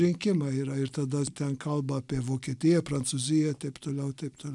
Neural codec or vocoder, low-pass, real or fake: none; 10.8 kHz; real